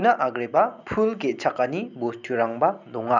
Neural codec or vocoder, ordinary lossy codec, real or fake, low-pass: none; none; real; 7.2 kHz